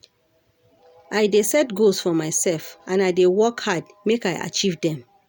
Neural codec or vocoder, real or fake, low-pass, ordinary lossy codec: none; real; none; none